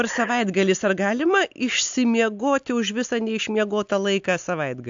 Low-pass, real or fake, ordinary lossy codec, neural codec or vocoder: 7.2 kHz; real; AAC, 64 kbps; none